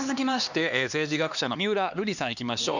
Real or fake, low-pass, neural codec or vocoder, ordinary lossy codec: fake; 7.2 kHz; codec, 16 kHz, 2 kbps, X-Codec, HuBERT features, trained on LibriSpeech; none